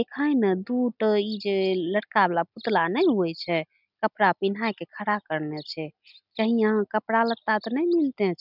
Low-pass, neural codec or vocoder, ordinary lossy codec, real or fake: 5.4 kHz; none; none; real